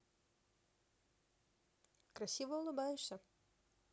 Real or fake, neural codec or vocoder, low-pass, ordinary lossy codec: real; none; none; none